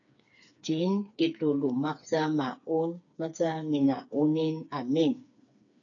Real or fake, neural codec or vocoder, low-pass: fake; codec, 16 kHz, 4 kbps, FreqCodec, smaller model; 7.2 kHz